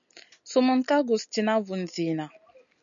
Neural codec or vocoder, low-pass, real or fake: none; 7.2 kHz; real